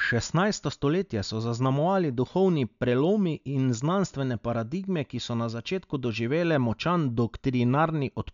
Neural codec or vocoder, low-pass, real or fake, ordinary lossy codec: none; 7.2 kHz; real; none